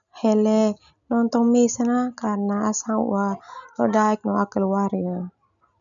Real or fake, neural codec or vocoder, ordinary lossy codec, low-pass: real; none; none; 7.2 kHz